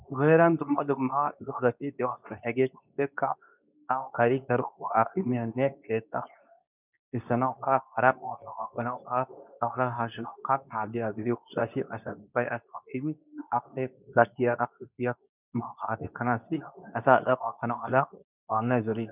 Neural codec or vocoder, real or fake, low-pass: codec, 24 kHz, 0.9 kbps, WavTokenizer, medium speech release version 2; fake; 3.6 kHz